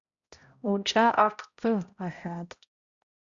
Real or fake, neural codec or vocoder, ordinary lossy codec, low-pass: fake; codec, 16 kHz, 0.5 kbps, X-Codec, HuBERT features, trained on balanced general audio; Opus, 64 kbps; 7.2 kHz